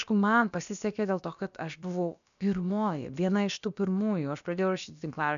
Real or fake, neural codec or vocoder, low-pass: fake; codec, 16 kHz, about 1 kbps, DyCAST, with the encoder's durations; 7.2 kHz